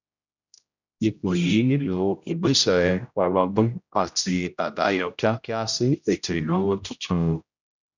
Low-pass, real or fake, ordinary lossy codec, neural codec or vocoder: 7.2 kHz; fake; none; codec, 16 kHz, 0.5 kbps, X-Codec, HuBERT features, trained on general audio